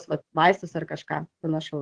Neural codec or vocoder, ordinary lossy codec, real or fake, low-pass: vocoder, 22.05 kHz, 80 mel bands, WaveNeXt; Opus, 16 kbps; fake; 9.9 kHz